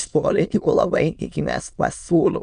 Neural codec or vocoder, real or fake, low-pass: autoencoder, 22.05 kHz, a latent of 192 numbers a frame, VITS, trained on many speakers; fake; 9.9 kHz